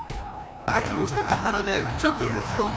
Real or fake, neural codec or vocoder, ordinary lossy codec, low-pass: fake; codec, 16 kHz, 1 kbps, FreqCodec, larger model; none; none